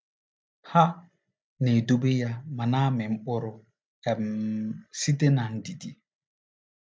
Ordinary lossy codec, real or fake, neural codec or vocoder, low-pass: none; real; none; none